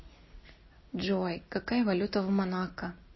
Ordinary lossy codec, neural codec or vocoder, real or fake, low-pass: MP3, 24 kbps; none; real; 7.2 kHz